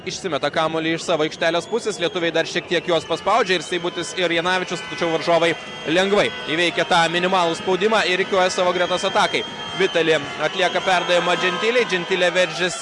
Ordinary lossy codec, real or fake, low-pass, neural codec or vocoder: Opus, 64 kbps; real; 10.8 kHz; none